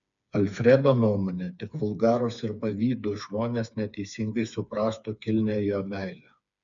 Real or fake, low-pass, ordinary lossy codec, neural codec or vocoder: fake; 7.2 kHz; MP3, 96 kbps; codec, 16 kHz, 4 kbps, FreqCodec, smaller model